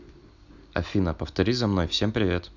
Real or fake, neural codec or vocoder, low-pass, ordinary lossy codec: real; none; 7.2 kHz; none